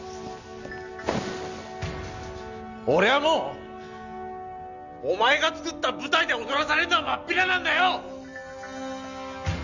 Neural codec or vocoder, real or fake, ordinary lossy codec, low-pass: none; real; none; 7.2 kHz